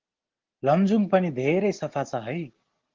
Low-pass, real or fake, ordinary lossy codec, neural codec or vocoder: 7.2 kHz; real; Opus, 16 kbps; none